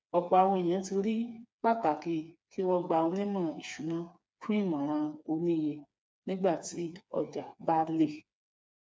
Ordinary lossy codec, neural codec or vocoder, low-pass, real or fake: none; codec, 16 kHz, 4 kbps, FreqCodec, smaller model; none; fake